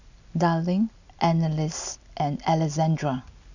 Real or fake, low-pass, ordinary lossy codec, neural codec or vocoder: real; 7.2 kHz; none; none